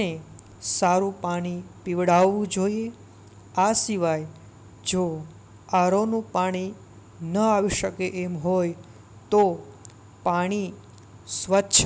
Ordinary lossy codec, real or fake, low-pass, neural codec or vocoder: none; real; none; none